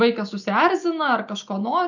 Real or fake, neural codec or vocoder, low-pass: real; none; 7.2 kHz